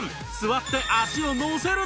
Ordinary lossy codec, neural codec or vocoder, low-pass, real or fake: none; none; none; real